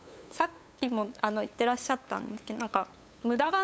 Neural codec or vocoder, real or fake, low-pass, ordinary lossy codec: codec, 16 kHz, 8 kbps, FunCodec, trained on LibriTTS, 25 frames a second; fake; none; none